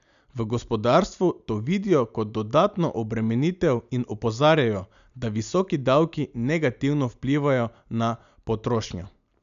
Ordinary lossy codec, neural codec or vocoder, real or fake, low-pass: none; none; real; 7.2 kHz